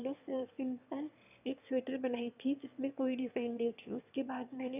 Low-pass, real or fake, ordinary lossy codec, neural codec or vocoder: 3.6 kHz; fake; none; autoencoder, 22.05 kHz, a latent of 192 numbers a frame, VITS, trained on one speaker